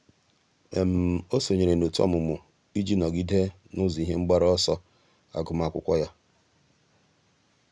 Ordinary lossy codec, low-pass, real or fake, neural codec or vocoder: none; 9.9 kHz; real; none